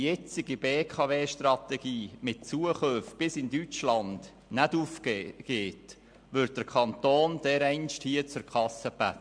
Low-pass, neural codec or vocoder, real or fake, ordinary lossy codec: 9.9 kHz; none; real; MP3, 64 kbps